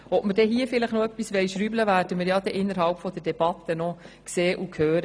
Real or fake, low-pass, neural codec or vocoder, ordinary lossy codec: real; none; none; none